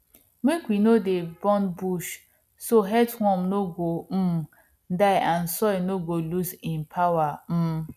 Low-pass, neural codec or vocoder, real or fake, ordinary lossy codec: 14.4 kHz; none; real; none